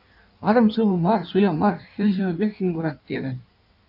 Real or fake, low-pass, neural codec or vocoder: fake; 5.4 kHz; codec, 16 kHz in and 24 kHz out, 1.1 kbps, FireRedTTS-2 codec